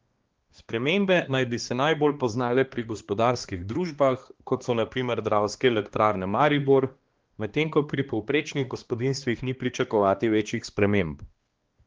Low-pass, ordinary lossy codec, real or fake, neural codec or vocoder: 7.2 kHz; Opus, 16 kbps; fake; codec, 16 kHz, 2 kbps, X-Codec, HuBERT features, trained on balanced general audio